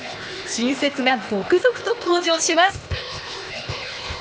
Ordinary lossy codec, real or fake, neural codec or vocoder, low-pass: none; fake; codec, 16 kHz, 0.8 kbps, ZipCodec; none